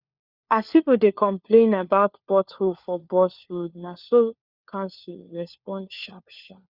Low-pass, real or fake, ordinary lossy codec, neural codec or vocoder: 5.4 kHz; fake; Opus, 64 kbps; codec, 16 kHz, 4 kbps, FunCodec, trained on LibriTTS, 50 frames a second